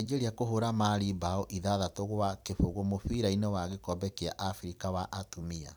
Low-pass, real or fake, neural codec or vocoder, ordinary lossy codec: none; fake; vocoder, 44.1 kHz, 128 mel bands every 256 samples, BigVGAN v2; none